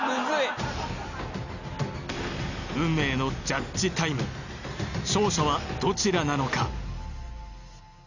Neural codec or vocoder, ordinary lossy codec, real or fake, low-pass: none; none; real; 7.2 kHz